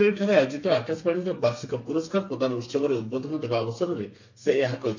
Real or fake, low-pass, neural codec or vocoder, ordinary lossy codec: fake; 7.2 kHz; codec, 32 kHz, 1.9 kbps, SNAC; MP3, 48 kbps